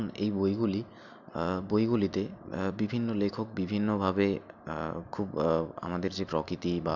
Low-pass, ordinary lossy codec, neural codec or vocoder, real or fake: 7.2 kHz; none; none; real